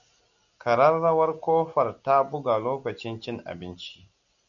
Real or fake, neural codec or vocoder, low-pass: real; none; 7.2 kHz